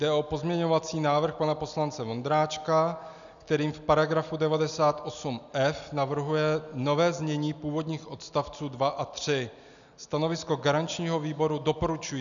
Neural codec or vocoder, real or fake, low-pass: none; real; 7.2 kHz